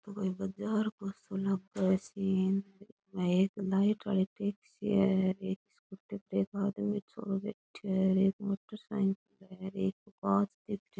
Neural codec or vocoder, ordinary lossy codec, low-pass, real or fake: none; none; none; real